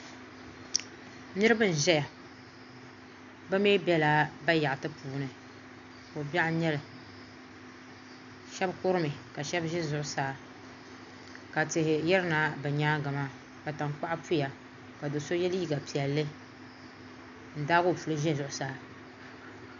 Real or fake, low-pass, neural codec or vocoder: real; 7.2 kHz; none